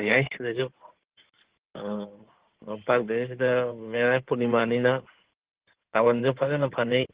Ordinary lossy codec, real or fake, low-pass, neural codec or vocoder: Opus, 16 kbps; fake; 3.6 kHz; codec, 16 kHz in and 24 kHz out, 2.2 kbps, FireRedTTS-2 codec